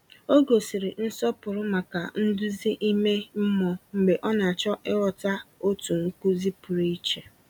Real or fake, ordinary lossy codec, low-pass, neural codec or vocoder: real; none; 19.8 kHz; none